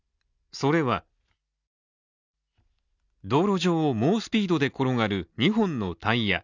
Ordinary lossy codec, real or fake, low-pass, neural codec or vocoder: none; real; 7.2 kHz; none